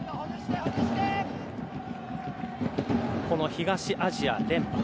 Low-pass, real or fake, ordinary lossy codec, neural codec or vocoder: none; real; none; none